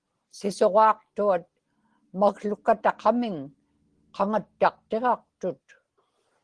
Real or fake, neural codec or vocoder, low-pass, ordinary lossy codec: real; none; 9.9 kHz; Opus, 16 kbps